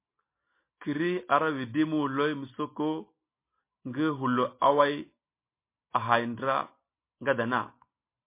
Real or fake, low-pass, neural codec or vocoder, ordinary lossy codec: real; 3.6 kHz; none; MP3, 24 kbps